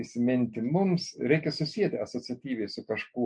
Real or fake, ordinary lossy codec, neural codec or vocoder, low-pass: real; MP3, 48 kbps; none; 9.9 kHz